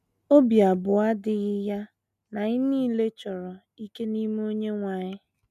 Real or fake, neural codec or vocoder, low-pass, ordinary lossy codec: real; none; 14.4 kHz; none